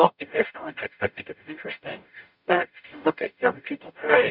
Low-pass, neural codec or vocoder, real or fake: 5.4 kHz; codec, 44.1 kHz, 0.9 kbps, DAC; fake